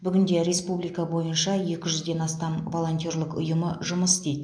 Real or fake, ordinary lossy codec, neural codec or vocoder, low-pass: real; AAC, 64 kbps; none; 9.9 kHz